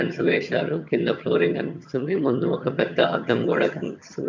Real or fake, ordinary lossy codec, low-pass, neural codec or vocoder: fake; MP3, 64 kbps; 7.2 kHz; vocoder, 22.05 kHz, 80 mel bands, HiFi-GAN